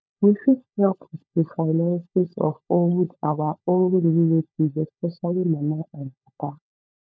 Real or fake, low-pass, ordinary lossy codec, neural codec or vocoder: fake; none; none; codec, 16 kHz, 16 kbps, FunCodec, trained on LibriTTS, 50 frames a second